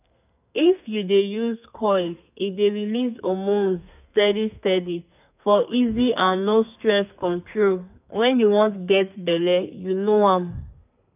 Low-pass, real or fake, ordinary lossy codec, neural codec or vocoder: 3.6 kHz; fake; none; codec, 32 kHz, 1.9 kbps, SNAC